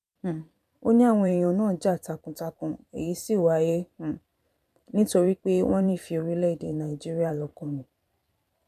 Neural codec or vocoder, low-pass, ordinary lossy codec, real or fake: vocoder, 44.1 kHz, 128 mel bands every 512 samples, BigVGAN v2; 14.4 kHz; none; fake